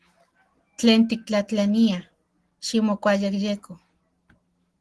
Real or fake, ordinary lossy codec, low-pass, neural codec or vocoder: real; Opus, 16 kbps; 10.8 kHz; none